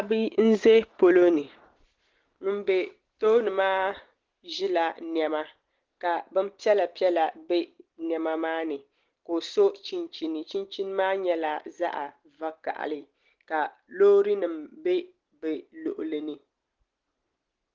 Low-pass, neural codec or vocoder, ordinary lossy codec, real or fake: 7.2 kHz; none; Opus, 16 kbps; real